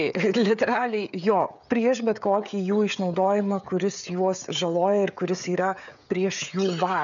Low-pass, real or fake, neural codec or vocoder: 7.2 kHz; fake; codec, 16 kHz, 16 kbps, FreqCodec, smaller model